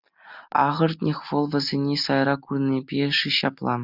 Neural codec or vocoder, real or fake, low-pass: none; real; 5.4 kHz